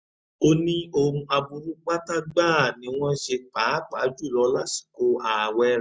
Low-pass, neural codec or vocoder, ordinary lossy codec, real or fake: none; none; none; real